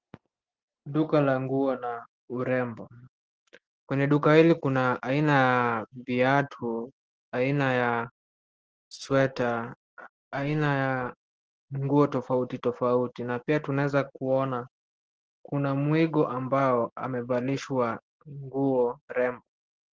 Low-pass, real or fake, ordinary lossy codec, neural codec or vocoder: 7.2 kHz; real; Opus, 16 kbps; none